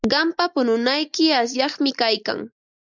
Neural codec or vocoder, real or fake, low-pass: none; real; 7.2 kHz